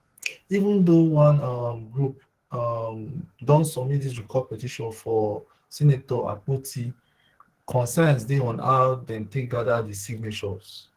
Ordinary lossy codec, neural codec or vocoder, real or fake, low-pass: Opus, 16 kbps; codec, 44.1 kHz, 2.6 kbps, SNAC; fake; 14.4 kHz